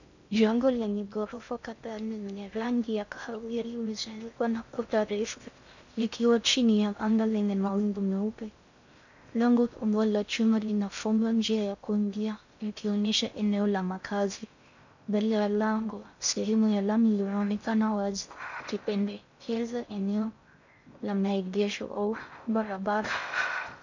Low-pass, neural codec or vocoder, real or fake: 7.2 kHz; codec, 16 kHz in and 24 kHz out, 0.6 kbps, FocalCodec, streaming, 2048 codes; fake